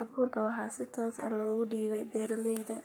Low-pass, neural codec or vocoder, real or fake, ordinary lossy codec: none; codec, 44.1 kHz, 3.4 kbps, Pupu-Codec; fake; none